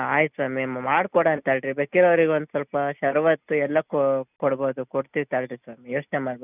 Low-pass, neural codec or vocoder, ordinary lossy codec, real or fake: 3.6 kHz; none; none; real